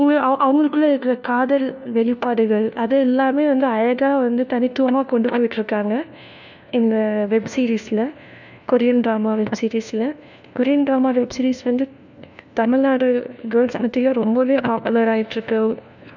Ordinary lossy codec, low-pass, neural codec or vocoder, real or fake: none; 7.2 kHz; codec, 16 kHz, 1 kbps, FunCodec, trained on LibriTTS, 50 frames a second; fake